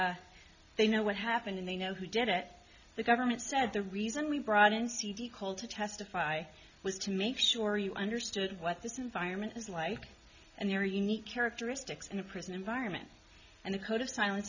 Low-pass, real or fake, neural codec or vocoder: 7.2 kHz; real; none